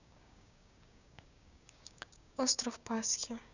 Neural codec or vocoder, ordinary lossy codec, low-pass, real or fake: codec, 16 kHz, 6 kbps, DAC; none; 7.2 kHz; fake